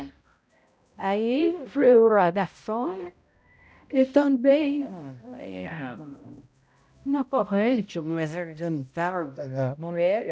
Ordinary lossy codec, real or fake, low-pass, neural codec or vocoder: none; fake; none; codec, 16 kHz, 0.5 kbps, X-Codec, HuBERT features, trained on balanced general audio